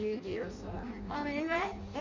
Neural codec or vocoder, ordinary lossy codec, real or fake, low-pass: codec, 16 kHz in and 24 kHz out, 0.6 kbps, FireRedTTS-2 codec; MP3, 64 kbps; fake; 7.2 kHz